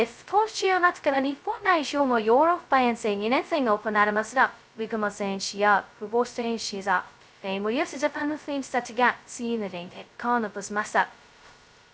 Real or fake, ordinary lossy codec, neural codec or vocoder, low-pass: fake; none; codec, 16 kHz, 0.2 kbps, FocalCodec; none